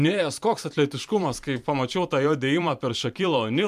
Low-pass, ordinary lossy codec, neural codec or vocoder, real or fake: 14.4 kHz; MP3, 96 kbps; vocoder, 44.1 kHz, 128 mel bands every 512 samples, BigVGAN v2; fake